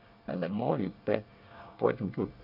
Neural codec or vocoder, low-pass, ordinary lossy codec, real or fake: codec, 24 kHz, 1 kbps, SNAC; 5.4 kHz; none; fake